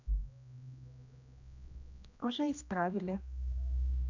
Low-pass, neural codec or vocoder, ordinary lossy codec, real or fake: 7.2 kHz; codec, 16 kHz, 1 kbps, X-Codec, HuBERT features, trained on general audio; none; fake